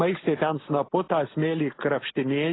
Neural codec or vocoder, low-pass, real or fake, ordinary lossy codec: none; 7.2 kHz; real; AAC, 16 kbps